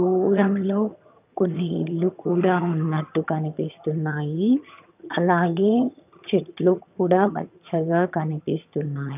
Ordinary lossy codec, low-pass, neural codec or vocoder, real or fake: none; 3.6 kHz; vocoder, 22.05 kHz, 80 mel bands, HiFi-GAN; fake